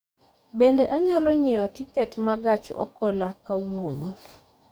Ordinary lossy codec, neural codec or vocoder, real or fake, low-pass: none; codec, 44.1 kHz, 2.6 kbps, DAC; fake; none